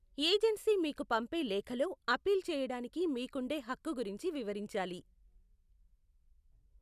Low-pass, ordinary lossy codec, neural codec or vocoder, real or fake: 14.4 kHz; none; none; real